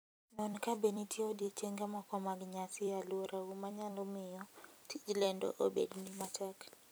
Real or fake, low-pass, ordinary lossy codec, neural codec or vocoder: fake; none; none; vocoder, 44.1 kHz, 128 mel bands every 256 samples, BigVGAN v2